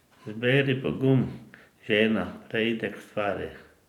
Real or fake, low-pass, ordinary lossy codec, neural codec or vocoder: fake; 19.8 kHz; none; vocoder, 44.1 kHz, 128 mel bands every 512 samples, BigVGAN v2